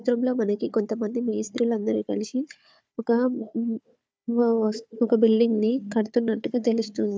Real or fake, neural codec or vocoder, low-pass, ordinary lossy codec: fake; codec, 16 kHz, 4 kbps, FunCodec, trained on Chinese and English, 50 frames a second; none; none